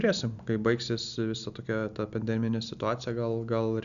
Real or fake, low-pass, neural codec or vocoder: real; 7.2 kHz; none